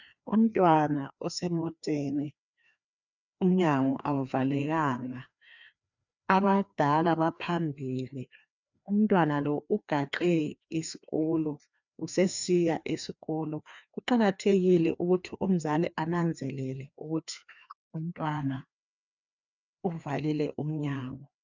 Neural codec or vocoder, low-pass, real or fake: codec, 16 kHz, 2 kbps, FreqCodec, larger model; 7.2 kHz; fake